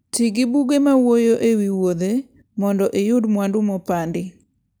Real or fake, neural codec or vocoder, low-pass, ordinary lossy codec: real; none; none; none